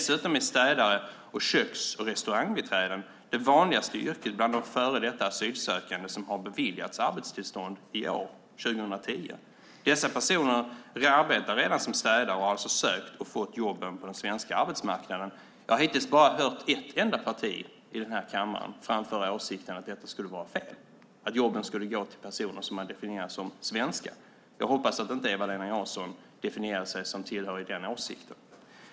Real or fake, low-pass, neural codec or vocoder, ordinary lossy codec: real; none; none; none